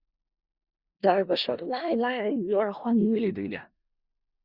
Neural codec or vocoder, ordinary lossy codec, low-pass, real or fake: codec, 16 kHz in and 24 kHz out, 0.4 kbps, LongCat-Audio-Codec, four codebook decoder; Opus, 64 kbps; 5.4 kHz; fake